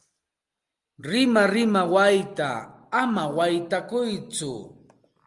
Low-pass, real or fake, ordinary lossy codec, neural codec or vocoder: 10.8 kHz; real; Opus, 32 kbps; none